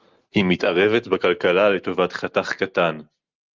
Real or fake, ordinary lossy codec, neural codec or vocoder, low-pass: real; Opus, 24 kbps; none; 7.2 kHz